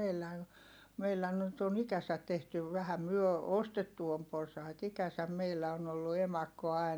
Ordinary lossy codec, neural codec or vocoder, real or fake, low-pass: none; none; real; none